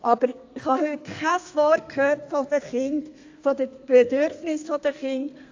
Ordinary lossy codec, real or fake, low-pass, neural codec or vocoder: AAC, 48 kbps; fake; 7.2 kHz; codec, 32 kHz, 1.9 kbps, SNAC